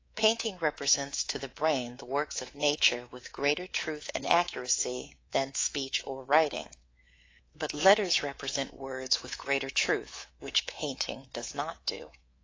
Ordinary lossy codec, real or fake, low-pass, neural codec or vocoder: AAC, 32 kbps; fake; 7.2 kHz; codec, 24 kHz, 3.1 kbps, DualCodec